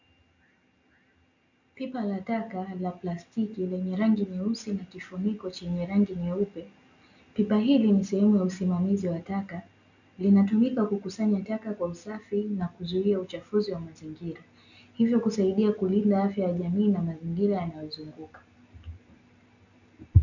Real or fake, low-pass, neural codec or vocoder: real; 7.2 kHz; none